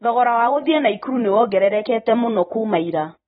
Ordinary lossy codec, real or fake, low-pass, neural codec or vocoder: AAC, 16 kbps; fake; 19.8 kHz; vocoder, 44.1 kHz, 128 mel bands every 512 samples, BigVGAN v2